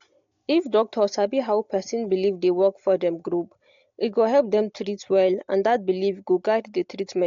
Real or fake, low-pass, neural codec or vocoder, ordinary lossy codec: real; 7.2 kHz; none; AAC, 48 kbps